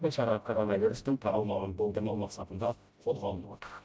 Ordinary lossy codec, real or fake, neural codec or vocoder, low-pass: none; fake; codec, 16 kHz, 0.5 kbps, FreqCodec, smaller model; none